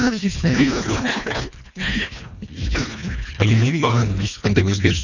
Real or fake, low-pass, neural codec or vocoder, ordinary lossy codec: fake; 7.2 kHz; codec, 24 kHz, 1.5 kbps, HILCodec; none